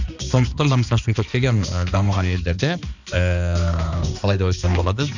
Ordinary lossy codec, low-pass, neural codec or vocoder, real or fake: none; 7.2 kHz; codec, 16 kHz, 2 kbps, X-Codec, HuBERT features, trained on balanced general audio; fake